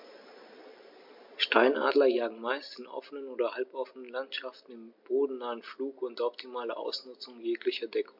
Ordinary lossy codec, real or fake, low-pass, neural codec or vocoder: MP3, 48 kbps; real; 5.4 kHz; none